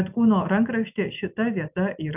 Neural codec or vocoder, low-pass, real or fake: none; 3.6 kHz; real